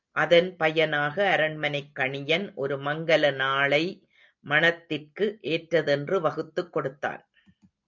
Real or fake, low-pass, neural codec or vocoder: real; 7.2 kHz; none